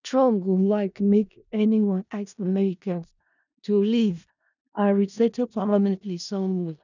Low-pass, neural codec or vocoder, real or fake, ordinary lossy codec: 7.2 kHz; codec, 16 kHz in and 24 kHz out, 0.4 kbps, LongCat-Audio-Codec, four codebook decoder; fake; none